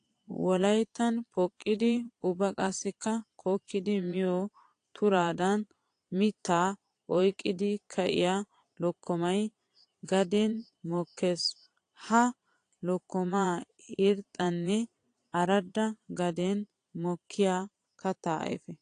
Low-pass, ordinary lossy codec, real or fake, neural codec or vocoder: 9.9 kHz; AAC, 48 kbps; fake; vocoder, 22.05 kHz, 80 mel bands, Vocos